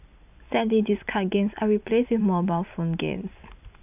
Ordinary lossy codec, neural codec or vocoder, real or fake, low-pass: none; vocoder, 44.1 kHz, 128 mel bands every 256 samples, BigVGAN v2; fake; 3.6 kHz